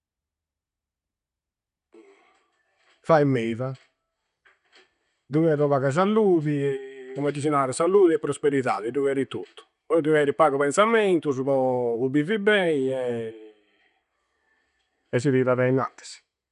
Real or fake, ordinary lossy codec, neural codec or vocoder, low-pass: real; none; none; 10.8 kHz